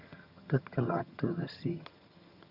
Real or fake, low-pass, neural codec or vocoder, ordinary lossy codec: fake; 5.4 kHz; vocoder, 22.05 kHz, 80 mel bands, HiFi-GAN; none